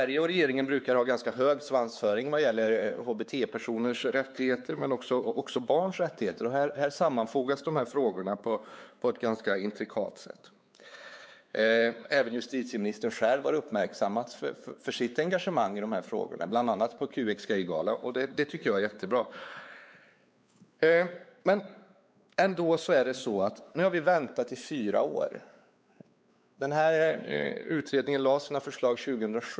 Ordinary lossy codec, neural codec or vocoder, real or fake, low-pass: none; codec, 16 kHz, 4 kbps, X-Codec, WavLM features, trained on Multilingual LibriSpeech; fake; none